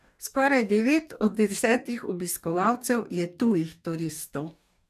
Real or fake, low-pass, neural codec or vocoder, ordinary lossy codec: fake; 14.4 kHz; codec, 44.1 kHz, 2.6 kbps, DAC; none